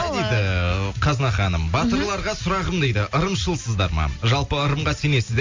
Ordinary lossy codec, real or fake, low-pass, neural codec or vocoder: MP3, 48 kbps; real; 7.2 kHz; none